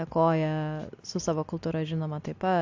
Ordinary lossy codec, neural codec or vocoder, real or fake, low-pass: AAC, 48 kbps; none; real; 7.2 kHz